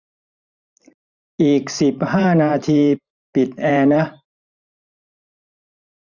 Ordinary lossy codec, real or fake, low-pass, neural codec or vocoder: none; fake; 7.2 kHz; vocoder, 44.1 kHz, 128 mel bands every 512 samples, BigVGAN v2